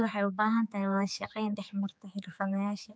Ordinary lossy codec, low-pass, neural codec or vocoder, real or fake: none; none; codec, 16 kHz, 4 kbps, X-Codec, HuBERT features, trained on general audio; fake